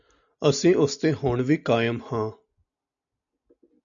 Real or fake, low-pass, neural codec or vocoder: real; 7.2 kHz; none